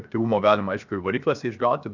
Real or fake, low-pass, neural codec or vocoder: fake; 7.2 kHz; codec, 16 kHz, 0.7 kbps, FocalCodec